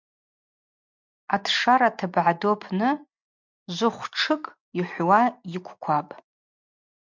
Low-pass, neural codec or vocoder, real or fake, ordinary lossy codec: 7.2 kHz; none; real; MP3, 64 kbps